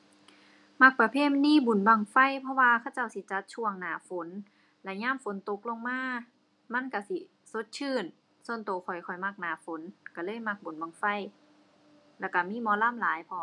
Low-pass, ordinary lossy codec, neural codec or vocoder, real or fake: 10.8 kHz; none; none; real